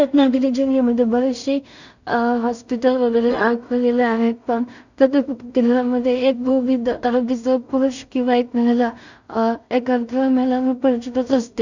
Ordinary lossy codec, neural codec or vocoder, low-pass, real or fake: none; codec, 16 kHz in and 24 kHz out, 0.4 kbps, LongCat-Audio-Codec, two codebook decoder; 7.2 kHz; fake